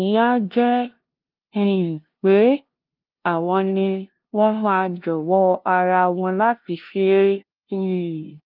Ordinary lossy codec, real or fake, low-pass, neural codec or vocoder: Opus, 32 kbps; fake; 5.4 kHz; codec, 16 kHz, 0.5 kbps, FunCodec, trained on LibriTTS, 25 frames a second